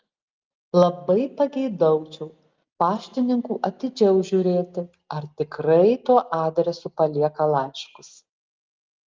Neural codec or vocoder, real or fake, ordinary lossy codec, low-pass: none; real; Opus, 24 kbps; 7.2 kHz